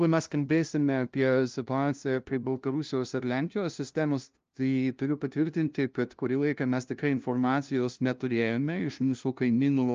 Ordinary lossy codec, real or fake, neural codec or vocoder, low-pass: Opus, 24 kbps; fake; codec, 16 kHz, 0.5 kbps, FunCodec, trained on LibriTTS, 25 frames a second; 7.2 kHz